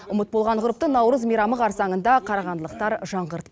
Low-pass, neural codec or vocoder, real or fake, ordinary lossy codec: none; none; real; none